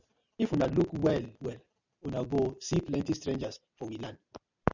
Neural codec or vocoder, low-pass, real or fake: none; 7.2 kHz; real